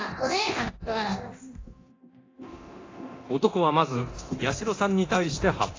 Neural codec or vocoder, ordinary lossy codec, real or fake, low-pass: codec, 24 kHz, 0.9 kbps, DualCodec; AAC, 32 kbps; fake; 7.2 kHz